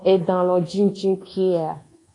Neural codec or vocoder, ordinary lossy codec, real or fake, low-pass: codec, 24 kHz, 1.2 kbps, DualCodec; AAC, 48 kbps; fake; 10.8 kHz